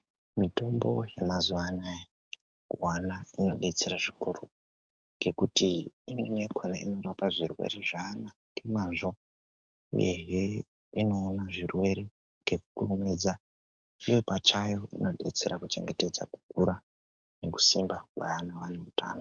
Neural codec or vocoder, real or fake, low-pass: codec, 44.1 kHz, 7.8 kbps, DAC; fake; 9.9 kHz